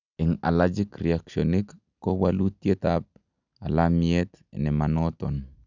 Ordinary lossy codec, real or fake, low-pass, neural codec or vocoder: none; real; 7.2 kHz; none